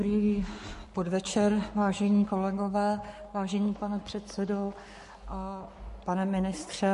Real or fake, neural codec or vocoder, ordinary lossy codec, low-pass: fake; codec, 44.1 kHz, 7.8 kbps, Pupu-Codec; MP3, 48 kbps; 14.4 kHz